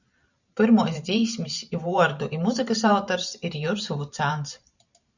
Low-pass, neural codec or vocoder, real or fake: 7.2 kHz; vocoder, 44.1 kHz, 128 mel bands every 512 samples, BigVGAN v2; fake